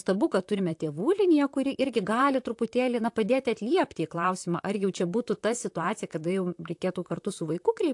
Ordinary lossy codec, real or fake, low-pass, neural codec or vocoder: AAC, 64 kbps; fake; 10.8 kHz; vocoder, 44.1 kHz, 128 mel bands, Pupu-Vocoder